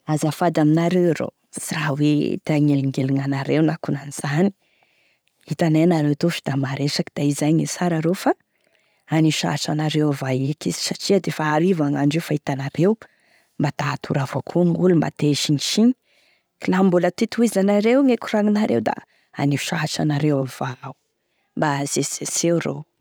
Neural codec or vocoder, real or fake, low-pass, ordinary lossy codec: none; real; none; none